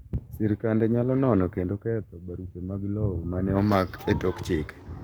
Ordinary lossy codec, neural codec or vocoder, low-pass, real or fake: none; codec, 44.1 kHz, 7.8 kbps, Pupu-Codec; none; fake